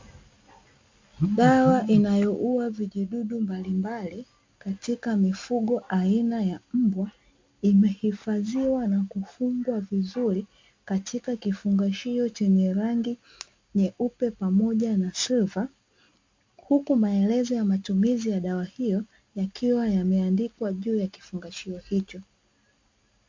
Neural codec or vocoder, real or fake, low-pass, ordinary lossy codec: none; real; 7.2 kHz; MP3, 48 kbps